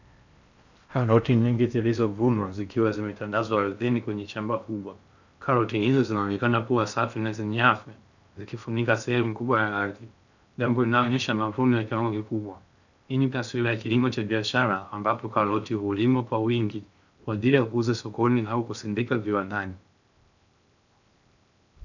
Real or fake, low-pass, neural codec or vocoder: fake; 7.2 kHz; codec, 16 kHz in and 24 kHz out, 0.6 kbps, FocalCodec, streaming, 4096 codes